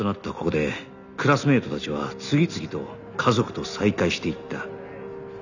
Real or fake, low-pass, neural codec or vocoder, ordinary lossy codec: real; 7.2 kHz; none; none